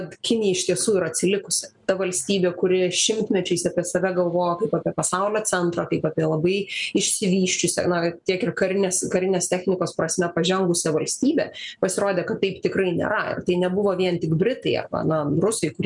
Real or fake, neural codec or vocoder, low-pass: real; none; 10.8 kHz